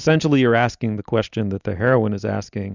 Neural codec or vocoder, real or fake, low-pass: none; real; 7.2 kHz